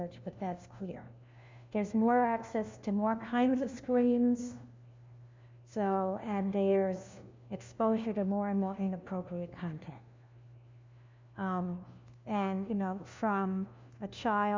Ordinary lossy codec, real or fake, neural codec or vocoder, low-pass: MP3, 64 kbps; fake; codec, 16 kHz, 1 kbps, FunCodec, trained on LibriTTS, 50 frames a second; 7.2 kHz